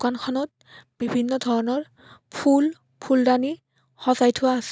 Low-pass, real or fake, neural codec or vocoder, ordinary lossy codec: none; real; none; none